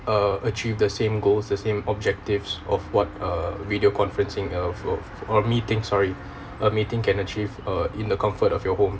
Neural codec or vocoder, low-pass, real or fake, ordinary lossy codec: none; none; real; none